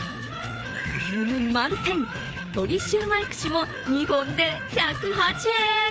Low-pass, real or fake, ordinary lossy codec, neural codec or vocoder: none; fake; none; codec, 16 kHz, 4 kbps, FreqCodec, larger model